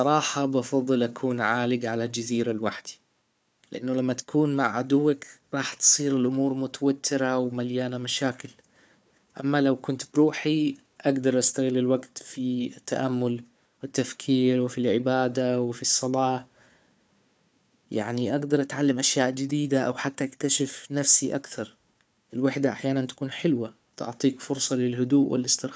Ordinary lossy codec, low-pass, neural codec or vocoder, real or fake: none; none; codec, 16 kHz, 4 kbps, FunCodec, trained on Chinese and English, 50 frames a second; fake